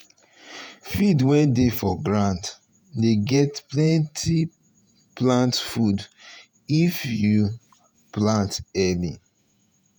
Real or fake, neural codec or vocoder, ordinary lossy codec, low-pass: fake; vocoder, 48 kHz, 128 mel bands, Vocos; none; none